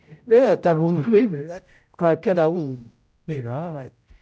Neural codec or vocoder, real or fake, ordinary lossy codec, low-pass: codec, 16 kHz, 0.5 kbps, X-Codec, HuBERT features, trained on general audio; fake; none; none